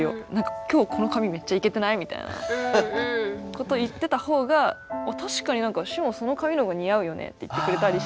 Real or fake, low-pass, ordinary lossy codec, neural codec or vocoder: real; none; none; none